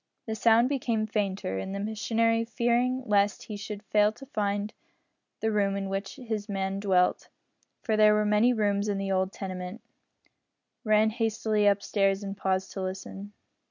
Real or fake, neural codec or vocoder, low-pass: real; none; 7.2 kHz